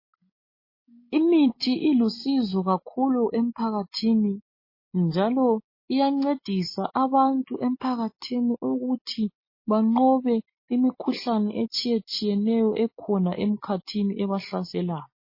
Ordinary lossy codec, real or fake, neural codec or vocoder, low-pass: MP3, 24 kbps; real; none; 5.4 kHz